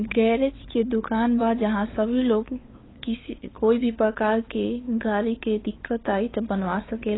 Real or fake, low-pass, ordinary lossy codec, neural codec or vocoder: fake; 7.2 kHz; AAC, 16 kbps; codec, 16 kHz, 8 kbps, FunCodec, trained on LibriTTS, 25 frames a second